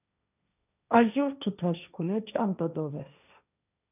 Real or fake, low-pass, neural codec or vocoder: fake; 3.6 kHz; codec, 16 kHz, 1.1 kbps, Voila-Tokenizer